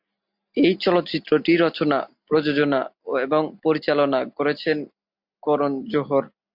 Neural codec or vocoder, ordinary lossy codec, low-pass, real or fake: none; MP3, 48 kbps; 5.4 kHz; real